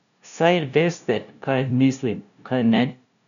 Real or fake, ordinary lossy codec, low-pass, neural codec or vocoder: fake; none; 7.2 kHz; codec, 16 kHz, 0.5 kbps, FunCodec, trained on LibriTTS, 25 frames a second